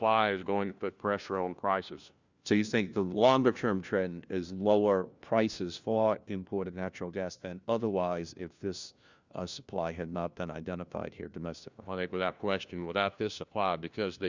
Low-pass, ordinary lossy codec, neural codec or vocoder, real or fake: 7.2 kHz; Opus, 64 kbps; codec, 16 kHz, 1 kbps, FunCodec, trained on LibriTTS, 50 frames a second; fake